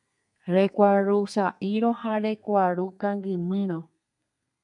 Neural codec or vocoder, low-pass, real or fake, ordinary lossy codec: codec, 32 kHz, 1.9 kbps, SNAC; 10.8 kHz; fake; MP3, 96 kbps